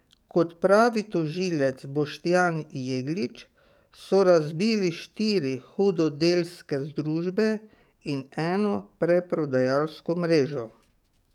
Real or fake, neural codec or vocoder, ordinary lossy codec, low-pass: fake; codec, 44.1 kHz, 7.8 kbps, DAC; none; 19.8 kHz